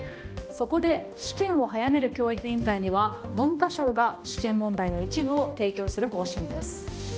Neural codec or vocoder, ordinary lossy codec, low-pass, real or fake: codec, 16 kHz, 1 kbps, X-Codec, HuBERT features, trained on balanced general audio; none; none; fake